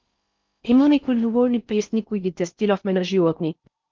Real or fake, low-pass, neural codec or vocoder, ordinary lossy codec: fake; 7.2 kHz; codec, 16 kHz in and 24 kHz out, 0.6 kbps, FocalCodec, streaming, 4096 codes; Opus, 24 kbps